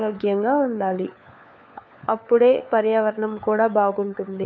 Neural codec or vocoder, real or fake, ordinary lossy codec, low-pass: codec, 16 kHz, 4 kbps, FunCodec, trained on LibriTTS, 50 frames a second; fake; none; none